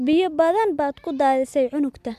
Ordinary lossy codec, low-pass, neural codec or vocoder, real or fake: MP3, 96 kbps; 14.4 kHz; none; real